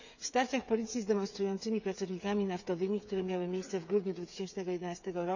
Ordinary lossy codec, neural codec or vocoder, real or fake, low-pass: none; codec, 16 kHz, 8 kbps, FreqCodec, smaller model; fake; 7.2 kHz